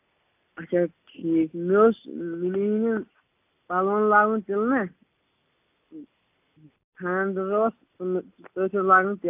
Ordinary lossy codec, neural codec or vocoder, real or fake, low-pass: AAC, 32 kbps; none; real; 3.6 kHz